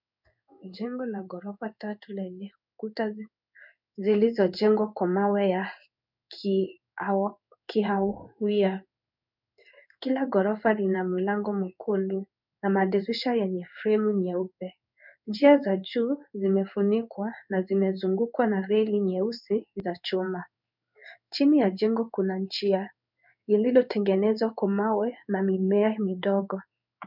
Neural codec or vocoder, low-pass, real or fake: codec, 16 kHz in and 24 kHz out, 1 kbps, XY-Tokenizer; 5.4 kHz; fake